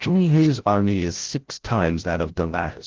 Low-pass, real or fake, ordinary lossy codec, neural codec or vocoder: 7.2 kHz; fake; Opus, 16 kbps; codec, 16 kHz, 0.5 kbps, FreqCodec, larger model